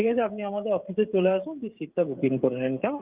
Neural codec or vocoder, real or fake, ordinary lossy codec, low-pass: codec, 16 kHz, 16 kbps, FreqCodec, smaller model; fake; Opus, 24 kbps; 3.6 kHz